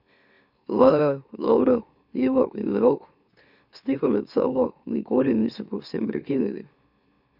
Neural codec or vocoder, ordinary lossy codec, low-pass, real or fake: autoencoder, 44.1 kHz, a latent of 192 numbers a frame, MeloTTS; none; 5.4 kHz; fake